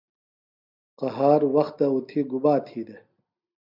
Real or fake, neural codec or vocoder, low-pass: real; none; 5.4 kHz